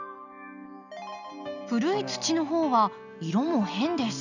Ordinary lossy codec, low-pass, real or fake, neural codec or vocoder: none; 7.2 kHz; real; none